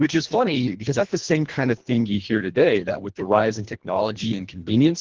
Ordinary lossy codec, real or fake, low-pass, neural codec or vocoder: Opus, 16 kbps; fake; 7.2 kHz; codec, 24 kHz, 1.5 kbps, HILCodec